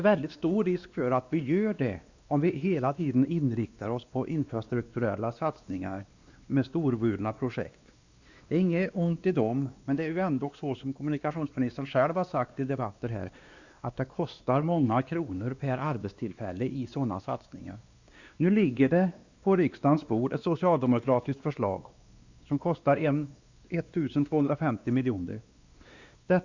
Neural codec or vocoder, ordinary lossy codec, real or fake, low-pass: codec, 16 kHz, 2 kbps, X-Codec, WavLM features, trained on Multilingual LibriSpeech; Opus, 64 kbps; fake; 7.2 kHz